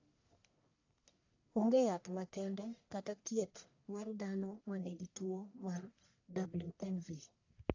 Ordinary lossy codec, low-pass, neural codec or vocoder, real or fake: AAC, 48 kbps; 7.2 kHz; codec, 44.1 kHz, 1.7 kbps, Pupu-Codec; fake